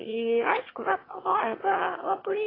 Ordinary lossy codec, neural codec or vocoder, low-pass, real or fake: AAC, 24 kbps; autoencoder, 22.05 kHz, a latent of 192 numbers a frame, VITS, trained on one speaker; 5.4 kHz; fake